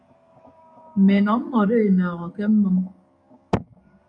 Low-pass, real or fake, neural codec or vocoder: 9.9 kHz; fake; codec, 44.1 kHz, 7.8 kbps, DAC